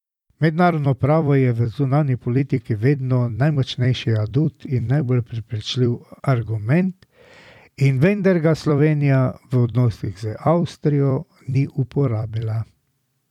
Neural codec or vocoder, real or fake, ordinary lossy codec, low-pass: vocoder, 44.1 kHz, 128 mel bands every 256 samples, BigVGAN v2; fake; none; 19.8 kHz